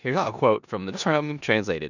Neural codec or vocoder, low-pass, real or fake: codec, 16 kHz in and 24 kHz out, 0.9 kbps, LongCat-Audio-Codec, four codebook decoder; 7.2 kHz; fake